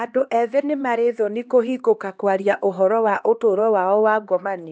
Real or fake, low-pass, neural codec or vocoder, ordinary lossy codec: fake; none; codec, 16 kHz, 2 kbps, X-Codec, HuBERT features, trained on LibriSpeech; none